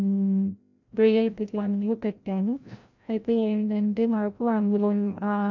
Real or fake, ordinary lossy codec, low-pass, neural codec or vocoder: fake; none; 7.2 kHz; codec, 16 kHz, 0.5 kbps, FreqCodec, larger model